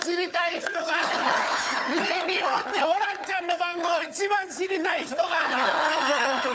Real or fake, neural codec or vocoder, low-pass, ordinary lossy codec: fake; codec, 16 kHz, 4 kbps, FunCodec, trained on Chinese and English, 50 frames a second; none; none